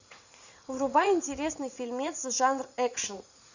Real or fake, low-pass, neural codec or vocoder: fake; 7.2 kHz; vocoder, 44.1 kHz, 80 mel bands, Vocos